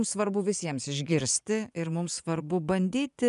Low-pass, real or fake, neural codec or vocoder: 10.8 kHz; fake; vocoder, 24 kHz, 100 mel bands, Vocos